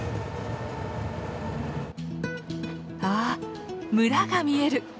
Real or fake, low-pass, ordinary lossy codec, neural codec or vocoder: real; none; none; none